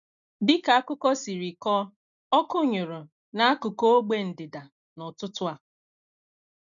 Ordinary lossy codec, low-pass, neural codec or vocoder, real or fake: none; 7.2 kHz; none; real